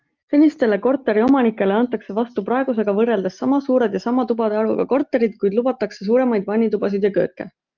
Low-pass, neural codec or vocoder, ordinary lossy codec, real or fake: 7.2 kHz; none; Opus, 24 kbps; real